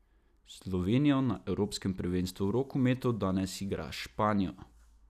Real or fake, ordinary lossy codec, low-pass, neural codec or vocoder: real; none; 14.4 kHz; none